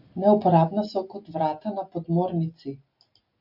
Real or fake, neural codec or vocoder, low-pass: real; none; 5.4 kHz